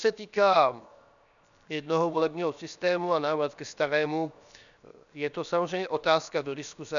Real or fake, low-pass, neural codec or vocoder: fake; 7.2 kHz; codec, 16 kHz, 0.7 kbps, FocalCodec